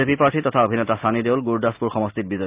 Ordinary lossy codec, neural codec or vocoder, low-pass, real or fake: Opus, 32 kbps; none; 3.6 kHz; real